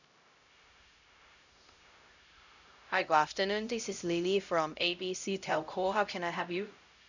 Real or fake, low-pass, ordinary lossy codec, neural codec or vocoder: fake; 7.2 kHz; none; codec, 16 kHz, 0.5 kbps, X-Codec, HuBERT features, trained on LibriSpeech